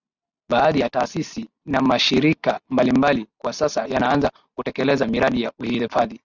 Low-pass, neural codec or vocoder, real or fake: 7.2 kHz; none; real